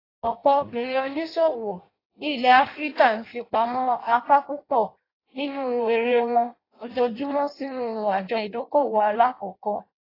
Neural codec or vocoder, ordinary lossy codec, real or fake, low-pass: codec, 16 kHz in and 24 kHz out, 0.6 kbps, FireRedTTS-2 codec; AAC, 24 kbps; fake; 5.4 kHz